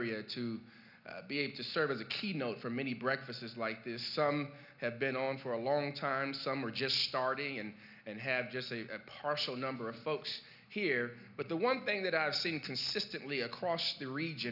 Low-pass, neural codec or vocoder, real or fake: 5.4 kHz; none; real